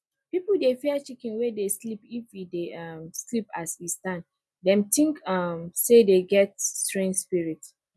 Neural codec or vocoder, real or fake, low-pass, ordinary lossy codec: none; real; none; none